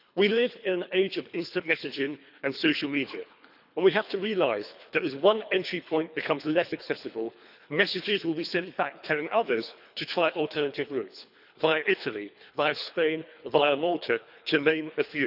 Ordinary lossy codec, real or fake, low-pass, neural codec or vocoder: none; fake; 5.4 kHz; codec, 24 kHz, 3 kbps, HILCodec